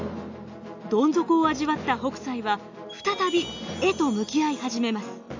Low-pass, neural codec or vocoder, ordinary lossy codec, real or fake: 7.2 kHz; none; AAC, 48 kbps; real